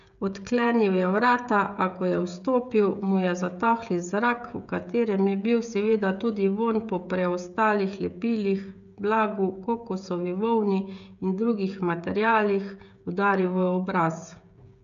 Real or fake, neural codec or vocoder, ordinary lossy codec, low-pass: fake; codec, 16 kHz, 16 kbps, FreqCodec, smaller model; none; 7.2 kHz